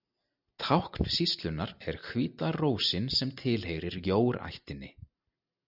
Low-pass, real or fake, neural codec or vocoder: 5.4 kHz; real; none